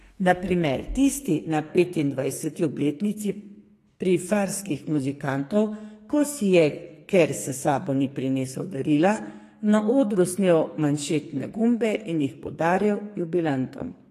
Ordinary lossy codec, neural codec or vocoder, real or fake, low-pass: AAC, 48 kbps; codec, 44.1 kHz, 2.6 kbps, SNAC; fake; 14.4 kHz